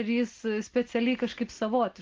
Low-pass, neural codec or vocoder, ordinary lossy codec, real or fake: 7.2 kHz; none; Opus, 16 kbps; real